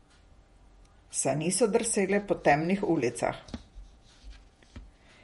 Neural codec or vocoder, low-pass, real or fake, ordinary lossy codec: vocoder, 48 kHz, 128 mel bands, Vocos; 19.8 kHz; fake; MP3, 48 kbps